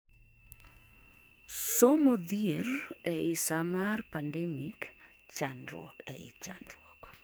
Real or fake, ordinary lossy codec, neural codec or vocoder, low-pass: fake; none; codec, 44.1 kHz, 2.6 kbps, SNAC; none